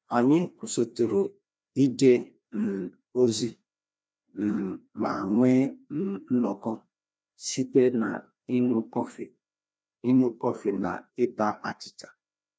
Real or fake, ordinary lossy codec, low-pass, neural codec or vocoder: fake; none; none; codec, 16 kHz, 1 kbps, FreqCodec, larger model